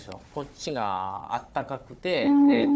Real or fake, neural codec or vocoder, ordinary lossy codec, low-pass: fake; codec, 16 kHz, 4 kbps, FunCodec, trained on Chinese and English, 50 frames a second; none; none